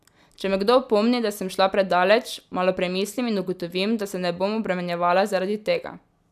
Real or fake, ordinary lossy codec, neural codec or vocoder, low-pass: real; none; none; 14.4 kHz